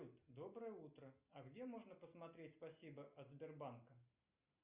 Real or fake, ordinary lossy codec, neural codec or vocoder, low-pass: real; Opus, 64 kbps; none; 3.6 kHz